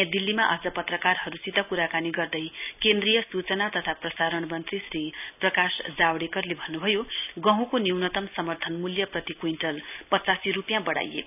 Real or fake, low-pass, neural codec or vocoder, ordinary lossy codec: real; 3.6 kHz; none; none